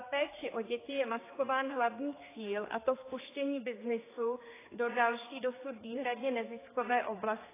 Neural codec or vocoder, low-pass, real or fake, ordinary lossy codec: codec, 16 kHz, 4 kbps, X-Codec, HuBERT features, trained on general audio; 3.6 kHz; fake; AAC, 16 kbps